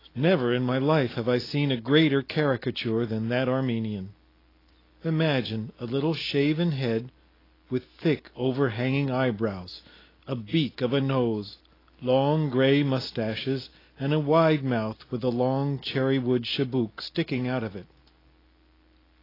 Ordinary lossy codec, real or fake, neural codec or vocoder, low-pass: AAC, 24 kbps; real; none; 5.4 kHz